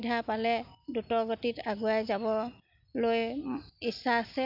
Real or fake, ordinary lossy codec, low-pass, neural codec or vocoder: real; none; 5.4 kHz; none